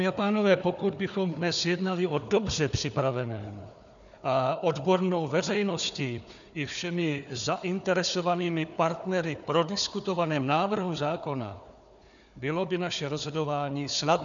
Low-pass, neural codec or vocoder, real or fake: 7.2 kHz; codec, 16 kHz, 4 kbps, FunCodec, trained on Chinese and English, 50 frames a second; fake